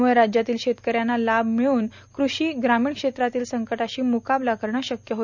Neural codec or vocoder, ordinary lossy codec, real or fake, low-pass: none; none; real; 7.2 kHz